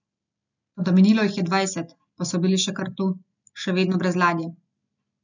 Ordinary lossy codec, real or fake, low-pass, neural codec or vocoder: none; real; 7.2 kHz; none